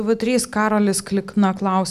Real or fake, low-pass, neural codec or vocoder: real; 14.4 kHz; none